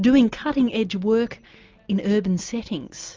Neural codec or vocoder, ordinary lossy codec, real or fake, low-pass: none; Opus, 24 kbps; real; 7.2 kHz